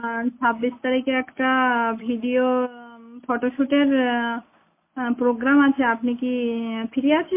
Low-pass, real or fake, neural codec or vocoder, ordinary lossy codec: 3.6 kHz; real; none; MP3, 24 kbps